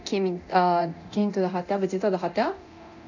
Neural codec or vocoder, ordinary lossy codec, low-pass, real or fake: codec, 24 kHz, 0.9 kbps, DualCodec; none; 7.2 kHz; fake